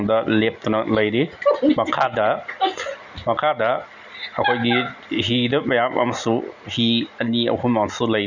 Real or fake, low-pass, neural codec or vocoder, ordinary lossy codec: real; 7.2 kHz; none; none